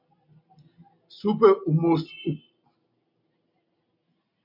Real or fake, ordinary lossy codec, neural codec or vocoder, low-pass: real; MP3, 48 kbps; none; 5.4 kHz